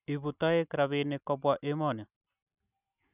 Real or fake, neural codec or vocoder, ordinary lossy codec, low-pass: real; none; none; 3.6 kHz